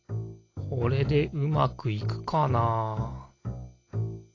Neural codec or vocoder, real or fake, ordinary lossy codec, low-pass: none; real; AAC, 32 kbps; 7.2 kHz